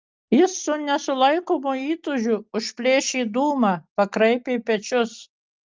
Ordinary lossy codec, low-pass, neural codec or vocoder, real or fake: Opus, 32 kbps; 7.2 kHz; none; real